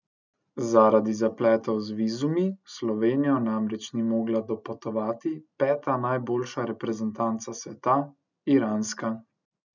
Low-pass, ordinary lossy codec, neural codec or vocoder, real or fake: 7.2 kHz; none; none; real